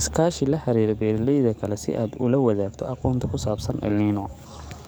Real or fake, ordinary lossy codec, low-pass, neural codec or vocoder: fake; none; none; codec, 44.1 kHz, 7.8 kbps, DAC